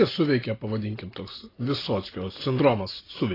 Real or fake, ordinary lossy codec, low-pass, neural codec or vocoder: real; AAC, 24 kbps; 5.4 kHz; none